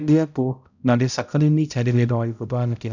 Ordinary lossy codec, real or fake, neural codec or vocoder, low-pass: none; fake; codec, 16 kHz, 0.5 kbps, X-Codec, HuBERT features, trained on balanced general audio; 7.2 kHz